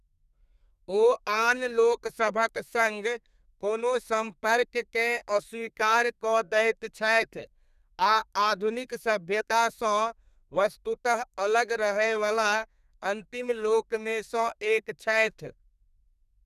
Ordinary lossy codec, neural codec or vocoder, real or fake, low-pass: none; codec, 32 kHz, 1.9 kbps, SNAC; fake; 14.4 kHz